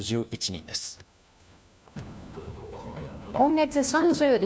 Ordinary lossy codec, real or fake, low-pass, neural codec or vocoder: none; fake; none; codec, 16 kHz, 1 kbps, FunCodec, trained on LibriTTS, 50 frames a second